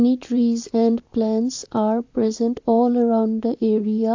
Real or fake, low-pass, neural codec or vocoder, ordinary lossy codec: fake; 7.2 kHz; vocoder, 44.1 kHz, 128 mel bands, Pupu-Vocoder; AAC, 48 kbps